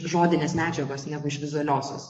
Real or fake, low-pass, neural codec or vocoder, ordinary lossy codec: fake; 9.9 kHz; codec, 44.1 kHz, 7.8 kbps, DAC; MP3, 48 kbps